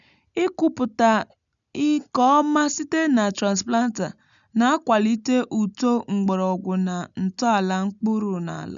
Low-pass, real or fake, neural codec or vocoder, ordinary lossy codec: 7.2 kHz; real; none; none